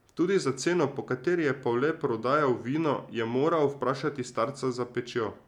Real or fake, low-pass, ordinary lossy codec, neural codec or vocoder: real; 19.8 kHz; none; none